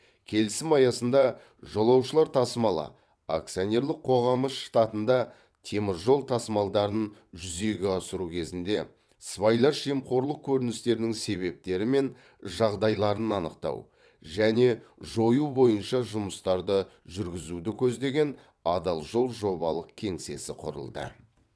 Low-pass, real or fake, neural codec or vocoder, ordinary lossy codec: none; fake; vocoder, 22.05 kHz, 80 mel bands, WaveNeXt; none